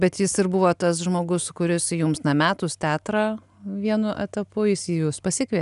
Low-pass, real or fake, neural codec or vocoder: 10.8 kHz; real; none